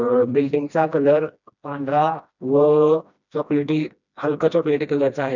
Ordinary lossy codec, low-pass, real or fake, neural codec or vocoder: none; 7.2 kHz; fake; codec, 16 kHz, 1 kbps, FreqCodec, smaller model